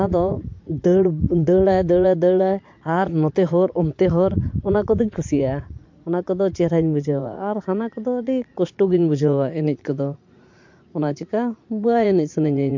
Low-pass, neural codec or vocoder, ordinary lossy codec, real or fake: 7.2 kHz; vocoder, 44.1 kHz, 80 mel bands, Vocos; MP3, 48 kbps; fake